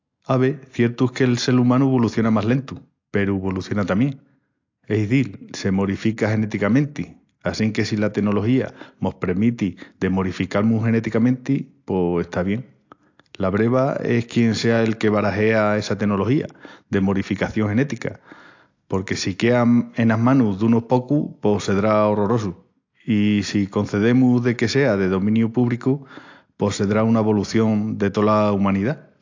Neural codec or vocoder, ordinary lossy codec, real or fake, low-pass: none; AAC, 48 kbps; real; 7.2 kHz